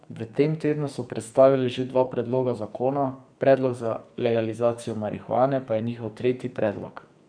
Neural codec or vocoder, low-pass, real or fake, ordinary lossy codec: codec, 44.1 kHz, 2.6 kbps, SNAC; 9.9 kHz; fake; none